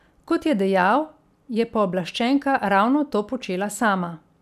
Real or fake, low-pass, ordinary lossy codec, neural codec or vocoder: real; 14.4 kHz; none; none